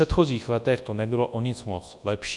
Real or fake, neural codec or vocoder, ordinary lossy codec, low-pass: fake; codec, 24 kHz, 0.9 kbps, WavTokenizer, large speech release; AAC, 64 kbps; 10.8 kHz